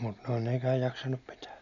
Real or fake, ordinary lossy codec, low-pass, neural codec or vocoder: real; none; 7.2 kHz; none